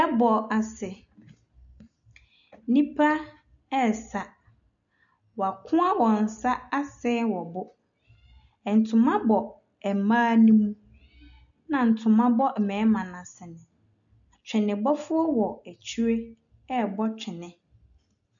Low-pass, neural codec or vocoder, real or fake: 7.2 kHz; none; real